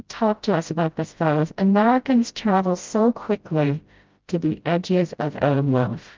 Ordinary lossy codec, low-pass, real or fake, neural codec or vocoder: Opus, 24 kbps; 7.2 kHz; fake; codec, 16 kHz, 0.5 kbps, FreqCodec, smaller model